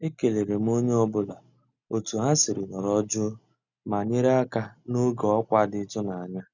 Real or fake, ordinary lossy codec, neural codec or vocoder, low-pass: real; none; none; 7.2 kHz